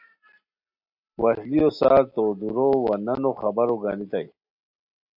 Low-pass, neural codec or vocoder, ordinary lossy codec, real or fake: 5.4 kHz; none; AAC, 48 kbps; real